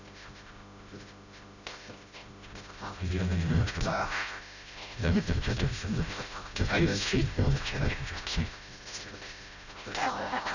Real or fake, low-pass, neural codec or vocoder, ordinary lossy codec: fake; 7.2 kHz; codec, 16 kHz, 0.5 kbps, FreqCodec, smaller model; none